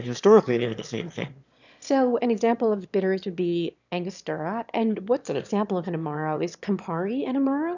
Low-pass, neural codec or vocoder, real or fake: 7.2 kHz; autoencoder, 22.05 kHz, a latent of 192 numbers a frame, VITS, trained on one speaker; fake